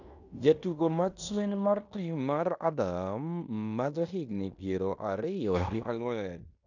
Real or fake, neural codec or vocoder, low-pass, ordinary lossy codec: fake; codec, 16 kHz in and 24 kHz out, 0.9 kbps, LongCat-Audio-Codec, fine tuned four codebook decoder; 7.2 kHz; none